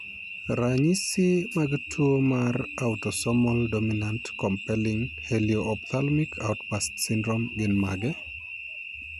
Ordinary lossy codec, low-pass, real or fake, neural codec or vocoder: none; 14.4 kHz; real; none